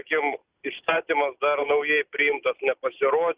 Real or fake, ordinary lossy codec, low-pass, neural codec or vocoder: real; Opus, 64 kbps; 3.6 kHz; none